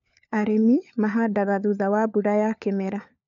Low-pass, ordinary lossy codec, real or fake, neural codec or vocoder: 7.2 kHz; none; fake; codec, 16 kHz, 4 kbps, FunCodec, trained on LibriTTS, 50 frames a second